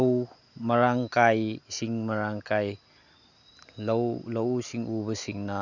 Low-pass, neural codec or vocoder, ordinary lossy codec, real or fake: 7.2 kHz; none; none; real